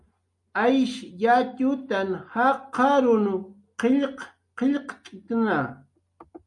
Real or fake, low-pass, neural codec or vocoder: real; 10.8 kHz; none